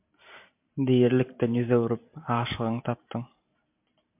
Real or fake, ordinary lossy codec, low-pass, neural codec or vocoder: fake; MP3, 32 kbps; 3.6 kHz; vocoder, 44.1 kHz, 128 mel bands every 512 samples, BigVGAN v2